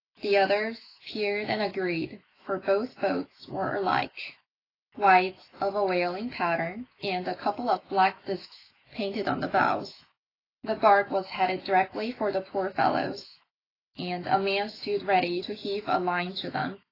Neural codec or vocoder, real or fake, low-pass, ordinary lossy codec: autoencoder, 48 kHz, 128 numbers a frame, DAC-VAE, trained on Japanese speech; fake; 5.4 kHz; AAC, 24 kbps